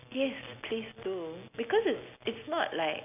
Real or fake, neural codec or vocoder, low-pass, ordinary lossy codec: real; none; 3.6 kHz; none